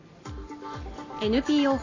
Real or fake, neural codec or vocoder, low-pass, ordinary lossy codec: real; none; 7.2 kHz; AAC, 32 kbps